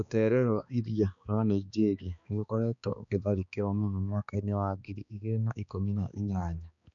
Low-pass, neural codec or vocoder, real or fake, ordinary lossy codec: 7.2 kHz; codec, 16 kHz, 2 kbps, X-Codec, HuBERT features, trained on balanced general audio; fake; none